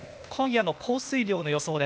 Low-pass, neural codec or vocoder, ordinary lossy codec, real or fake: none; codec, 16 kHz, 0.8 kbps, ZipCodec; none; fake